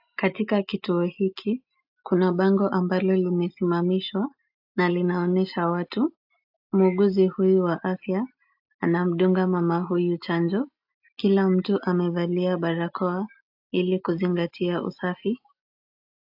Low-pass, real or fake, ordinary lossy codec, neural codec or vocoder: 5.4 kHz; real; AAC, 48 kbps; none